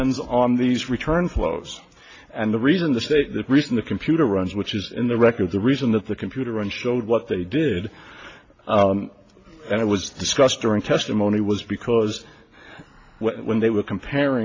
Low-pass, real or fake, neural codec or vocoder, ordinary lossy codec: 7.2 kHz; real; none; AAC, 32 kbps